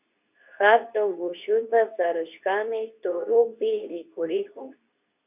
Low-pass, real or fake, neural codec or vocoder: 3.6 kHz; fake; codec, 24 kHz, 0.9 kbps, WavTokenizer, medium speech release version 2